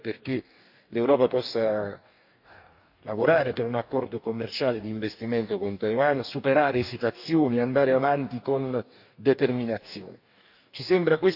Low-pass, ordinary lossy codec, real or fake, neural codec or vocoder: 5.4 kHz; none; fake; codec, 44.1 kHz, 2.6 kbps, DAC